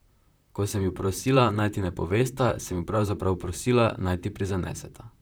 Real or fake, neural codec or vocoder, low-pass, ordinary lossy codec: fake; vocoder, 44.1 kHz, 128 mel bands, Pupu-Vocoder; none; none